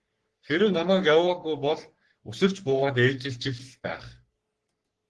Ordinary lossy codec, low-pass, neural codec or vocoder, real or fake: Opus, 16 kbps; 10.8 kHz; codec, 44.1 kHz, 3.4 kbps, Pupu-Codec; fake